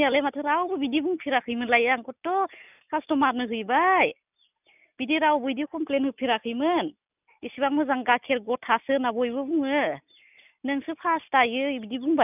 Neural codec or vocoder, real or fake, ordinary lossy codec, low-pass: none; real; none; 3.6 kHz